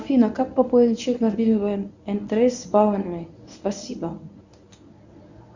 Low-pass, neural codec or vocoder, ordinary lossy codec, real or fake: 7.2 kHz; codec, 24 kHz, 0.9 kbps, WavTokenizer, medium speech release version 1; Opus, 64 kbps; fake